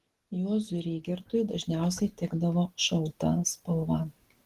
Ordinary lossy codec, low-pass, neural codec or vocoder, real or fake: Opus, 16 kbps; 14.4 kHz; none; real